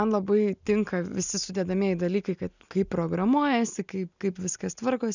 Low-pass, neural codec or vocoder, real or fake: 7.2 kHz; none; real